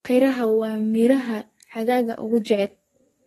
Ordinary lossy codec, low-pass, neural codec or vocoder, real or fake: AAC, 32 kbps; 14.4 kHz; codec, 32 kHz, 1.9 kbps, SNAC; fake